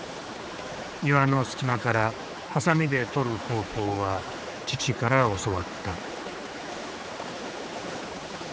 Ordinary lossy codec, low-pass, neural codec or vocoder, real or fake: none; none; codec, 16 kHz, 4 kbps, X-Codec, HuBERT features, trained on general audio; fake